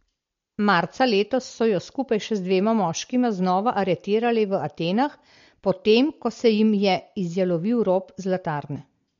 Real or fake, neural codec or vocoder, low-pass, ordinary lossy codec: real; none; 7.2 kHz; MP3, 48 kbps